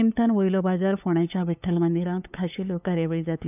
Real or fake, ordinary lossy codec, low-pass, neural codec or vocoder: fake; none; 3.6 kHz; codec, 16 kHz, 8 kbps, FunCodec, trained on LibriTTS, 25 frames a second